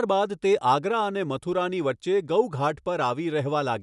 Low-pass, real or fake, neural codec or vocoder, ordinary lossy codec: 10.8 kHz; real; none; none